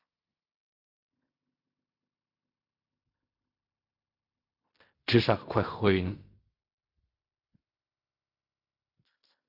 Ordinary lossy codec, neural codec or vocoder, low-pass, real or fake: Opus, 64 kbps; codec, 16 kHz in and 24 kHz out, 0.4 kbps, LongCat-Audio-Codec, fine tuned four codebook decoder; 5.4 kHz; fake